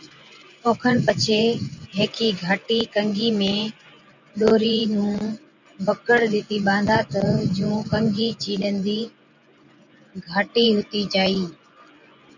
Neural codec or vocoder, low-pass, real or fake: vocoder, 24 kHz, 100 mel bands, Vocos; 7.2 kHz; fake